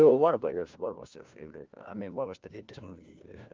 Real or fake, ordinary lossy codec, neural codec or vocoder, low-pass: fake; Opus, 32 kbps; codec, 16 kHz, 1 kbps, FunCodec, trained on LibriTTS, 50 frames a second; 7.2 kHz